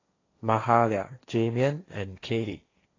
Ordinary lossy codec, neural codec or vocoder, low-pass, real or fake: AAC, 32 kbps; codec, 16 kHz, 1.1 kbps, Voila-Tokenizer; 7.2 kHz; fake